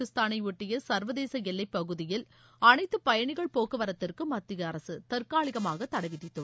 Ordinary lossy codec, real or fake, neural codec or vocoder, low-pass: none; real; none; none